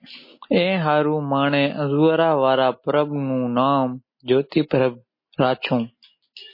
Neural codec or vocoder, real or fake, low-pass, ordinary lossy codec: none; real; 5.4 kHz; MP3, 24 kbps